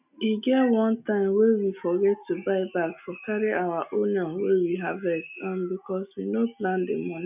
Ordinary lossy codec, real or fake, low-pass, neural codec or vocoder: none; real; 3.6 kHz; none